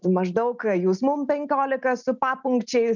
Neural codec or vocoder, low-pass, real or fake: none; 7.2 kHz; real